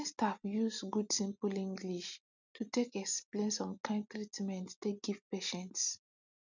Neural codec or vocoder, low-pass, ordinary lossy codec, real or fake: none; 7.2 kHz; none; real